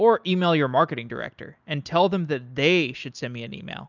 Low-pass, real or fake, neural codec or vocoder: 7.2 kHz; real; none